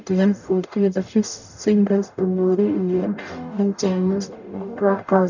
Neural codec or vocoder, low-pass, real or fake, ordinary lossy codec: codec, 44.1 kHz, 0.9 kbps, DAC; 7.2 kHz; fake; none